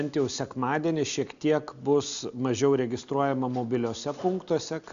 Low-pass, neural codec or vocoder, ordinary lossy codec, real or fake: 7.2 kHz; none; Opus, 64 kbps; real